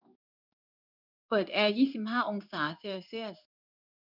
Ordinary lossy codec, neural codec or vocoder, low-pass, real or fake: none; codec, 16 kHz in and 24 kHz out, 1 kbps, XY-Tokenizer; 5.4 kHz; fake